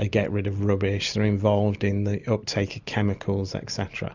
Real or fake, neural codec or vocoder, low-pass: real; none; 7.2 kHz